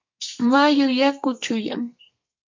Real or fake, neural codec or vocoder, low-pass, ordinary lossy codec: fake; codec, 32 kHz, 1.9 kbps, SNAC; 7.2 kHz; MP3, 48 kbps